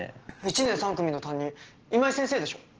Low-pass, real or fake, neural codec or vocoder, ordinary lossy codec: 7.2 kHz; real; none; Opus, 16 kbps